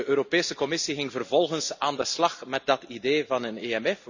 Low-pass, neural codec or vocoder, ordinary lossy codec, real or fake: 7.2 kHz; none; none; real